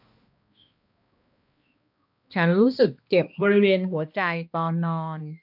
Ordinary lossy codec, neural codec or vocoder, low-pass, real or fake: AAC, 48 kbps; codec, 16 kHz, 1 kbps, X-Codec, HuBERT features, trained on balanced general audio; 5.4 kHz; fake